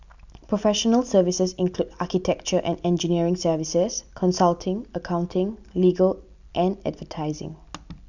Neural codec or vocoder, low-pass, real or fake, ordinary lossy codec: none; 7.2 kHz; real; none